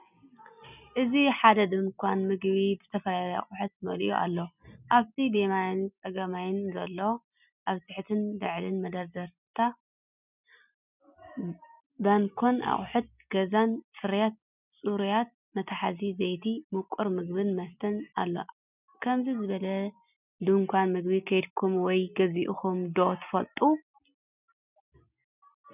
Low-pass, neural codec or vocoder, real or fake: 3.6 kHz; none; real